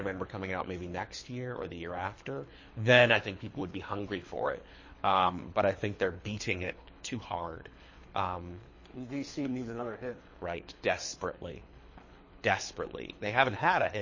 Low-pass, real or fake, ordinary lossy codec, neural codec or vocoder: 7.2 kHz; fake; MP3, 32 kbps; codec, 24 kHz, 3 kbps, HILCodec